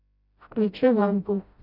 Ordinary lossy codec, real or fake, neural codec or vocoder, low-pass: none; fake; codec, 16 kHz, 0.5 kbps, FreqCodec, smaller model; 5.4 kHz